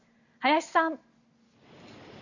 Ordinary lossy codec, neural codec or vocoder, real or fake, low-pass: none; none; real; 7.2 kHz